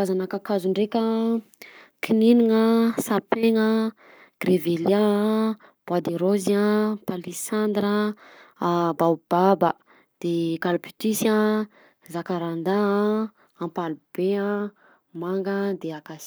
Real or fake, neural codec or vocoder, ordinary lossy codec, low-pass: fake; codec, 44.1 kHz, 7.8 kbps, Pupu-Codec; none; none